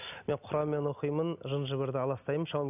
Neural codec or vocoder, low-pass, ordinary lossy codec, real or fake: none; 3.6 kHz; none; real